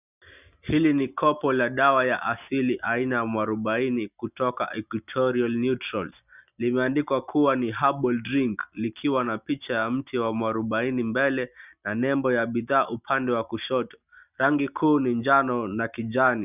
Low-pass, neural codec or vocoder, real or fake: 3.6 kHz; none; real